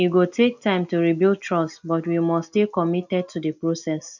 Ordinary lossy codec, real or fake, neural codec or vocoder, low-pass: none; real; none; 7.2 kHz